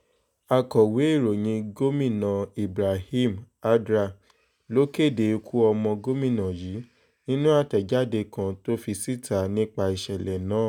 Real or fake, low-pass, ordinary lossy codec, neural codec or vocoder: real; 19.8 kHz; none; none